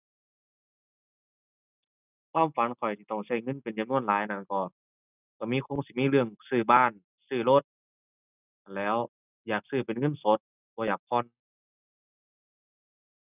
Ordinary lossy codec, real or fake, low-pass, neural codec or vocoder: none; real; 3.6 kHz; none